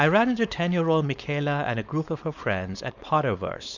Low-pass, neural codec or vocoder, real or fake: 7.2 kHz; codec, 16 kHz, 4.8 kbps, FACodec; fake